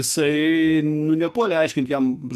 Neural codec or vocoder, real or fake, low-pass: codec, 44.1 kHz, 2.6 kbps, SNAC; fake; 14.4 kHz